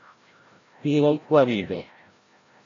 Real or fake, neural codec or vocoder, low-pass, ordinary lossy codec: fake; codec, 16 kHz, 0.5 kbps, FreqCodec, larger model; 7.2 kHz; AAC, 32 kbps